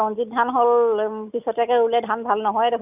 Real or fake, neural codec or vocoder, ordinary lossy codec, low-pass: real; none; none; 3.6 kHz